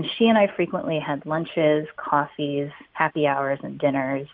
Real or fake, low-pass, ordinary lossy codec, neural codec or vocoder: real; 5.4 kHz; AAC, 48 kbps; none